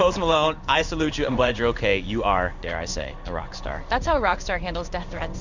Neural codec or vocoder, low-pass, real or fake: codec, 16 kHz in and 24 kHz out, 1 kbps, XY-Tokenizer; 7.2 kHz; fake